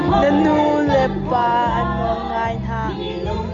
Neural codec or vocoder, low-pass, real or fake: none; 7.2 kHz; real